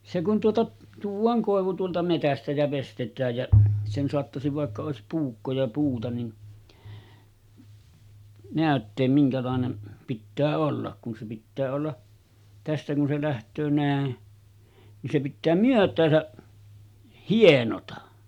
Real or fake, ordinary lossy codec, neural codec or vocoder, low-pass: real; none; none; 19.8 kHz